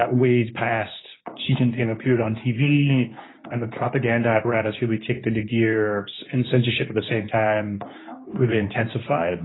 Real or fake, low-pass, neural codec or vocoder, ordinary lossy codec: fake; 7.2 kHz; codec, 24 kHz, 0.9 kbps, WavTokenizer, medium speech release version 2; AAC, 16 kbps